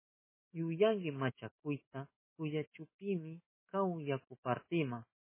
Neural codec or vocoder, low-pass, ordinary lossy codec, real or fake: autoencoder, 48 kHz, 128 numbers a frame, DAC-VAE, trained on Japanese speech; 3.6 kHz; MP3, 16 kbps; fake